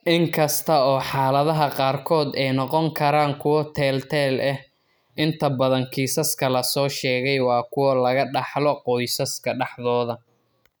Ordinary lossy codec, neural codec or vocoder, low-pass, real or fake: none; none; none; real